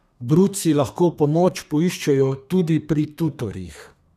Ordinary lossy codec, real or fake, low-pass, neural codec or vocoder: none; fake; 14.4 kHz; codec, 32 kHz, 1.9 kbps, SNAC